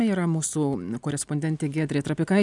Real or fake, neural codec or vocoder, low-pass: real; none; 10.8 kHz